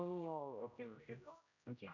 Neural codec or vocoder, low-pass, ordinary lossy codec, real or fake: codec, 16 kHz, 0.5 kbps, X-Codec, HuBERT features, trained on general audio; 7.2 kHz; none; fake